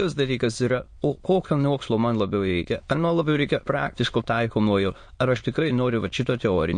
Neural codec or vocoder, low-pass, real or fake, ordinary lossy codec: autoencoder, 22.05 kHz, a latent of 192 numbers a frame, VITS, trained on many speakers; 9.9 kHz; fake; MP3, 48 kbps